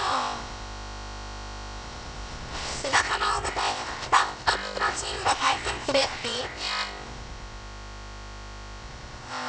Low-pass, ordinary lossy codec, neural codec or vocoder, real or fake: none; none; codec, 16 kHz, about 1 kbps, DyCAST, with the encoder's durations; fake